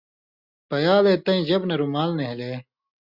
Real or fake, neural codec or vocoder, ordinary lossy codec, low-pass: real; none; Opus, 64 kbps; 5.4 kHz